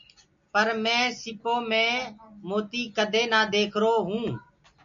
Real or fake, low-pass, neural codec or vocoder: real; 7.2 kHz; none